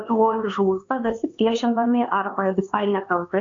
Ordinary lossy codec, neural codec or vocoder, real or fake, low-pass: AAC, 48 kbps; codec, 16 kHz, 2 kbps, X-Codec, HuBERT features, trained on LibriSpeech; fake; 7.2 kHz